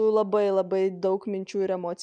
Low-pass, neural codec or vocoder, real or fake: 9.9 kHz; none; real